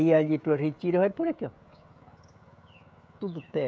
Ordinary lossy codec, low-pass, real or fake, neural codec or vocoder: none; none; fake; codec, 16 kHz, 16 kbps, FreqCodec, smaller model